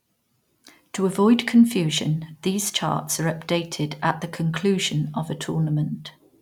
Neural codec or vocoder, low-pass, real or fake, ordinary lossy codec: none; 19.8 kHz; real; none